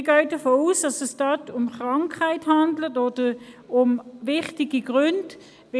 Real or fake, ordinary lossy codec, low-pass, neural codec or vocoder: real; none; none; none